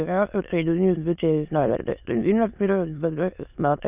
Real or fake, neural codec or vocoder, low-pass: fake; autoencoder, 22.05 kHz, a latent of 192 numbers a frame, VITS, trained on many speakers; 3.6 kHz